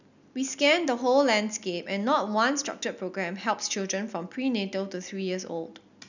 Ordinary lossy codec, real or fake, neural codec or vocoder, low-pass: none; real; none; 7.2 kHz